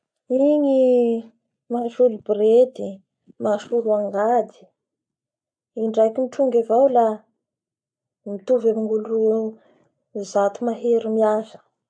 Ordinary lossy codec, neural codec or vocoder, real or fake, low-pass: AAC, 64 kbps; none; real; 9.9 kHz